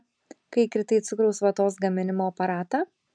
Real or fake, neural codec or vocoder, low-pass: real; none; 9.9 kHz